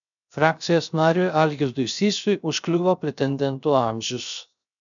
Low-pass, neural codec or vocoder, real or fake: 7.2 kHz; codec, 16 kHz, 0.3 kbps, FocalCodec; fake